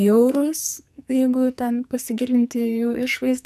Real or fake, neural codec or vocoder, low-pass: fake; codec, 44.1 kHz, 2.6 kbps, SNAC; 14.4 kHz